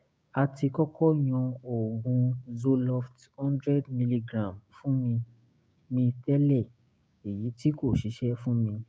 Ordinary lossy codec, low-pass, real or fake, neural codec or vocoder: none; none; fake; codec, 16 kHz, 6 kbps, DAC